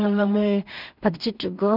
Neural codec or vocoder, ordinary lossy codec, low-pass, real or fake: codec, 16 kHz in and 24 kHz out, 0.4 kbps, LongCat-Audio-Codec, two codebook decoder; none; 5.4 kHz; fake